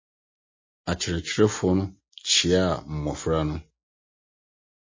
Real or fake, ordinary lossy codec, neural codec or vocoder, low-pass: real; MP3, 32 kbps; none; 7.2 kHz